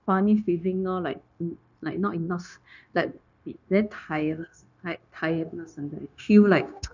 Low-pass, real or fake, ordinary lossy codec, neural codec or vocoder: 7.2 kHz; fake; none; codec, 16 kHz, 0.9 kbps, LongCat-Audio-Codec